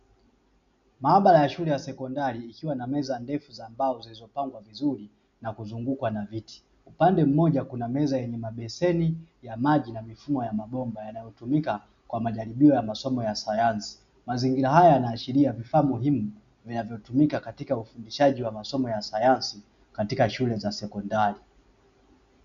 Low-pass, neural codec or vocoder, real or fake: 7.2 kHz; none; real